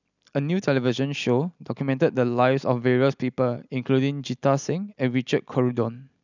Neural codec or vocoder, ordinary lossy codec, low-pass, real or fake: none; none; 7.2 kHz; real